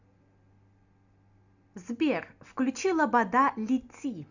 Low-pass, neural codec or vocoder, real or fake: 7.2 kHz; none; real